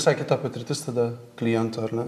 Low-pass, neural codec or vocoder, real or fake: 14.4 kHz; none; real